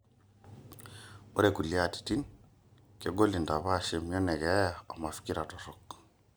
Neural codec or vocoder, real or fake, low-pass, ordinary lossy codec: none; real; none; none